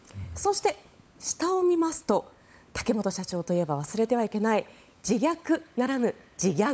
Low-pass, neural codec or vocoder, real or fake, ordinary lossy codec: none; codec, 16 kHz, 16 kbps, FunCodec, trained on LibriTTS, 50 frames a second; fake; none